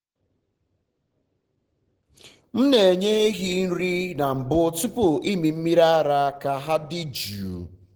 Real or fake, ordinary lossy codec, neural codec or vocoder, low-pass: real; Opus, 16 kbps; none; 19.8 kHz